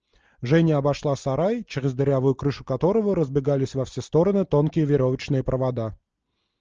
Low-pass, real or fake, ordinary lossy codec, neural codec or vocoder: 7.2 kHz; real; Opus, 24 kbps; none